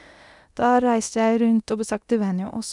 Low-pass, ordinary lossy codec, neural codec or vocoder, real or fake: 10.8 kHz; none; codec, 24 kHz, 0.9 kbps, DualCodec; fake